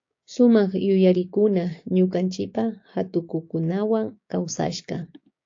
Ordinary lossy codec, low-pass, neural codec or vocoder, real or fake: AAC, 48 kbps; 7.2 kHz; codec, 16 kHz, 6 kbps, DAC; fake